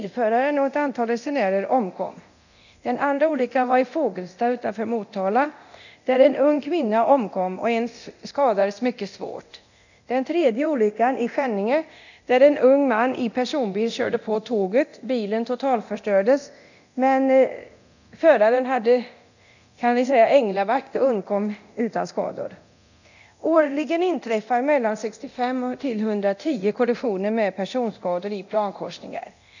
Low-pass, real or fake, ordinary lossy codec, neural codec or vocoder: 7.2 kHz; fake; none; codec, 24 kHz, 0.9 kbps, DualCodec